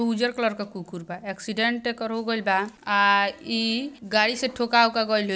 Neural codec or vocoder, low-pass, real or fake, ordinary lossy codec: none; none; real; none